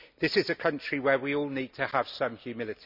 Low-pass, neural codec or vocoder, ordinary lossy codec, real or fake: 5.4 kHz; none; none; real